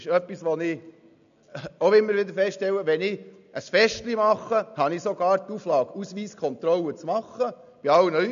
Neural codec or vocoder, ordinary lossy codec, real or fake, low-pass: none; none; real; 7.2 kHz